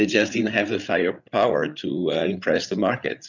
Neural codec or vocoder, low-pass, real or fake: codec, 16 kHz, 8 kbps, FreqCodec, larger model; 7.2 kHz; fake